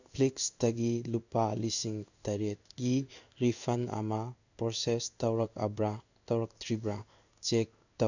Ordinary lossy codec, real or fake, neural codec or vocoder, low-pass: none; fake; codec, 16 kHz in and 24 kHz out, 1 kbps, XY-Tokenizer; 7.2 kHz